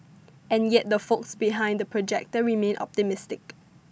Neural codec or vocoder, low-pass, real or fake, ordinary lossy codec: none; none; real; none